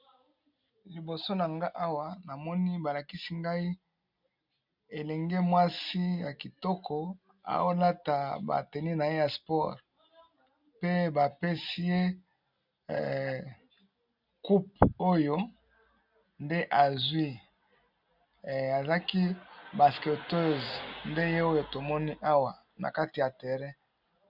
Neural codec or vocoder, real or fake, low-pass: none; real; 5.4 kHz